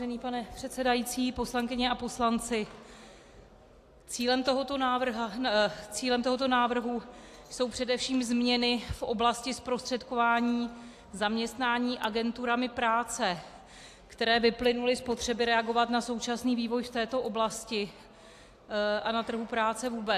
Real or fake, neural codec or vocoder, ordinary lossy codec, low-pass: real; none; AAC, 64 kbps; 14.4 kHz